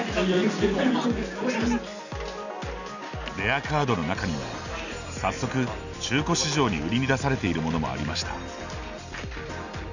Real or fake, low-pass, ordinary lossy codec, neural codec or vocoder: real; 7.2 kHz; none; none